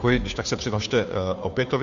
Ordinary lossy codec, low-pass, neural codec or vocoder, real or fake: Opus, 64 kbps; 7.2 kHz; codec, 16 kHz, 2 kbps, FunCodec, trained on Chinese and English, 25 frames a second; fake